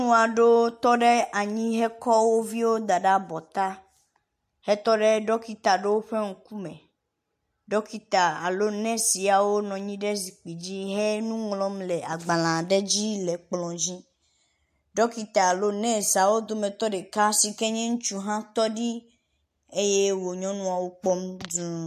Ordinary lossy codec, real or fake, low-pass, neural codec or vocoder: MP3, 64 kbps; real; 14.4 kHz; none